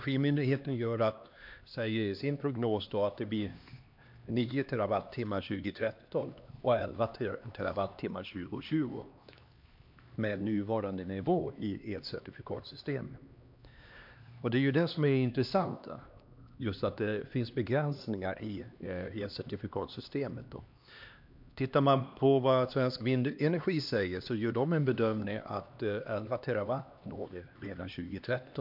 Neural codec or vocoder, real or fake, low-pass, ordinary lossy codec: codec, 16 kHz, 2 kbps, X-Codec, HuBERT features, trained on LibriSpeech; fake; 5.4 kHz; MP3, 48 kbps